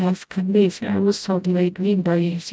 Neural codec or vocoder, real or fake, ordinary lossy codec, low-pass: codec, 16 kHz, 0.5 kbps, FreqCodec, smaller model; fake; none; none